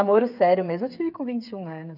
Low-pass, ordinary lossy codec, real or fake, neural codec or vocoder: 5.4 kHz; none; fake; codec, 16 kHz, 8 kbps, FreqCodec, smaller model